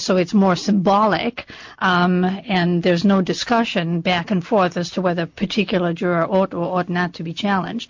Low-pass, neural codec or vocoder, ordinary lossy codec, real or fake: 7.2 kHz; none; MP3, 48 kbps; real